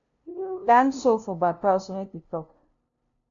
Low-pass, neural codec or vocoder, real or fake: 7.2 kHz; codec, 16 kHz, 0.5 kbps, FunCodec, trained on LibriTTS, 25 frames a second; fake